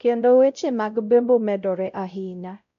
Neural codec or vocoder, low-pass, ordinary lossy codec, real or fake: codec, 16 kHz, 0.5 kbps, X-Codec, WavLM features, trained on Multilingual LibriSpeech; 7.2 kHz; MP3, 96 kbps; fake